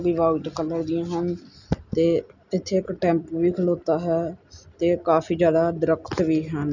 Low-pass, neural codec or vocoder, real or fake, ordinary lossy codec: 7.2 kHz; none; real; none